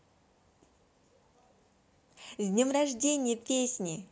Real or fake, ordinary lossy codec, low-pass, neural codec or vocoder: real; none; none; none